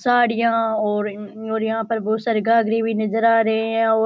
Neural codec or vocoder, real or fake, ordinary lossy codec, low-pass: none; real; none; none